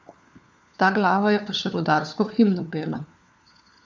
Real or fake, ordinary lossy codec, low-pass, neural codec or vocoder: fake; none; none; codec, 16 kHz, 4 kbps, FunCodec, trained on LibriTTS, 50 frames a second